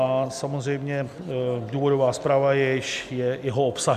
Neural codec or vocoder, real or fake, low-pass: none; real; 14.4 kHz